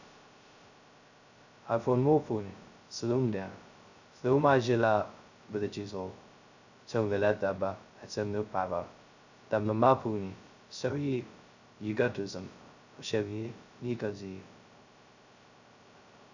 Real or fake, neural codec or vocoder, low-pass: fake; codec, 16 kHz, 0.2 kbps, FocalCodec; 7.2 kHz